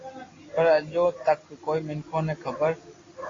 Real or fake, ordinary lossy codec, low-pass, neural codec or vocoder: real; AAC, 32 kbps; 7.2 kHz; none